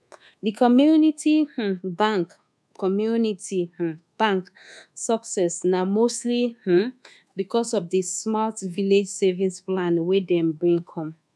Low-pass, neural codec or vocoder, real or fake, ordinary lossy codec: none; codec, 24 kHz, 1.2 kbps, DualCodec; fake; none